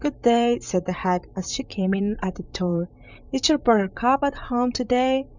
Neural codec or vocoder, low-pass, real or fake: vocoder, 44.1 kHz, 128 mel bands, Pupu-Vocoder; 7.2 kHz; fake